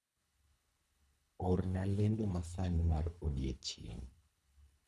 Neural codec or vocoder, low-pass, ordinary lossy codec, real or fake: codec, 24 kHz, 3 kbps, HILCodec; none; none; fake